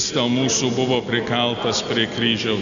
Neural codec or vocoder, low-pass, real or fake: none; 7.2 kHz; real